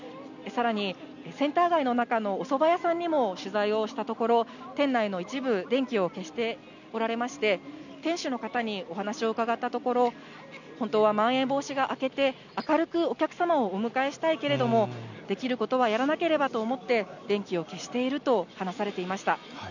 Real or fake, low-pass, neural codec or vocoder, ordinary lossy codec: real; 7.2 kHz; none; none